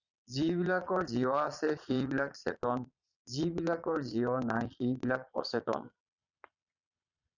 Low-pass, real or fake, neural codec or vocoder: 7.2 kHz; fake; vocoder, 22.05 kHz, 80 mel bands, Vocos